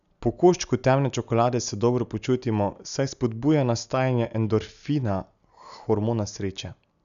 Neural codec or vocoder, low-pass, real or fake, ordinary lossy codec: none; 7.2 kHz; real; none